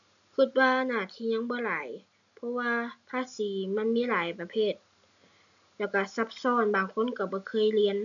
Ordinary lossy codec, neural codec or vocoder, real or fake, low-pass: none; none; real; 7.2 kHz